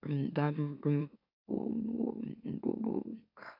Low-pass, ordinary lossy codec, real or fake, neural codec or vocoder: 5.4 kHz; none; fake; autoencoder, 44.1 kHz, a latent of 192 numbers a frame, MeloTTS